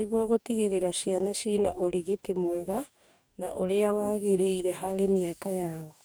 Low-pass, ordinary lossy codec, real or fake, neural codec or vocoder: none; none; fake; codec, 44.1 kHz, 2.6 kbps, DAC